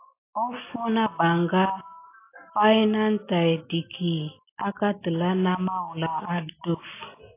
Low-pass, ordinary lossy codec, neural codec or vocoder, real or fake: 3.6 kHz; AAC, 24 kbps; none; real